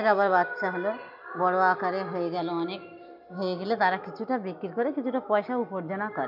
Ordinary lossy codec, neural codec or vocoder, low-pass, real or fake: none; none; 5.4 kHz; real